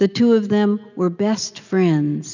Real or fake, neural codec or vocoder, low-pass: real; none; 7.2 kHz